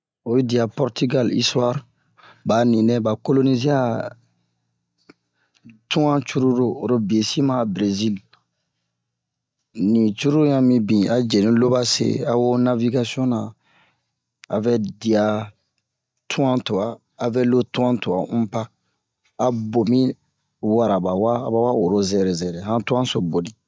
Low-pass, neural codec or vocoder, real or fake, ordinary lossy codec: none; none; real; none